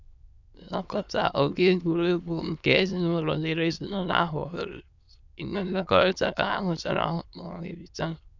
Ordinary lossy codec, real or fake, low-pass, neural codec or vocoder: none; fake; 7.2 kHz; autoencoder, 22.05 kHz, a latent of 192 numbers a frame, VITS, trained on many speakers